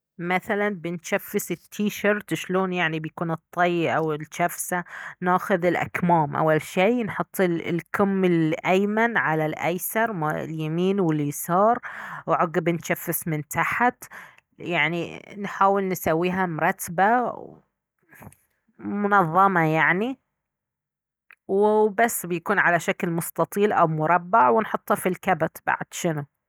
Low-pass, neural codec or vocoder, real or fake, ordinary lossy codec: none; none; real; none